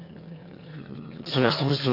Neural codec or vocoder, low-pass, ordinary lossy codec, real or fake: autoencoder, 22.05 kHz, a latent of 192 numbers a frame, VITS, trained on one speaker; 5.4 kHz; none; fake